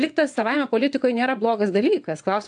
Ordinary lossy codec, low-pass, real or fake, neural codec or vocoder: AAC, 64 kbps; 9.9 kHz; fake; vocoder, 22.05 kHz, 80 mel bands, WaveNeXt